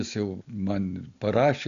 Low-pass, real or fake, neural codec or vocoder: 7.2 kHz; real; none